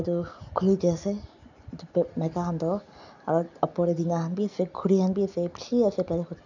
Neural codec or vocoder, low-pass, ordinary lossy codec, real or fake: none; 7.2 kHz; none; real